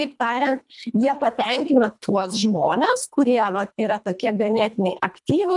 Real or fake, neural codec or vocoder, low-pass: fake; codec, 24 kHz, 1.5 kbps, HILCodec; 10.8 kHz